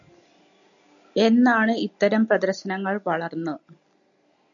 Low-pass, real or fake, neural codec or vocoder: 7.2 kHz; real; none